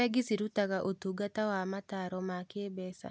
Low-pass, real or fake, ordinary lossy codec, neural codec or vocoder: none; real; none; none